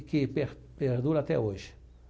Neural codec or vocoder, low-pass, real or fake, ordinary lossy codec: none; none; real; none